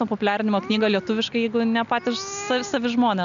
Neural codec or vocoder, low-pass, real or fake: none; 7.2 kHz; real